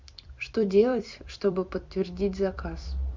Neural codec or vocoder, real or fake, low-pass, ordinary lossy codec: vocoder, 44.1 kHz, 128 mel bands every 256 samples, BigVGAN v2; fake; 7.2 kHz; MP3, 64 kbps